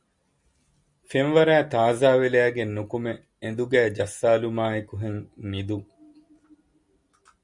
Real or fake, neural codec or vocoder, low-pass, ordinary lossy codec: real; none; 10.8 kHz; Opus, 64 kbps